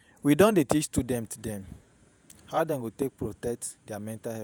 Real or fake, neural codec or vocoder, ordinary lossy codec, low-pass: fake; vocoder, 48 kHz, 128 mel bands, Vocos; none; none